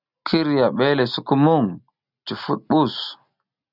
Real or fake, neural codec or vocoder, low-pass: real; none; 5.4 kHz